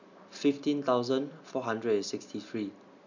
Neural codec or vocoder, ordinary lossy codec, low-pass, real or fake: none; none; 7.2 kHz; real